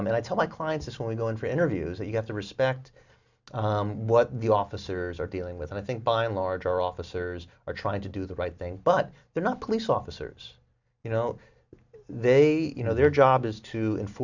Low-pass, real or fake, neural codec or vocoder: 7.2 kHz; real; none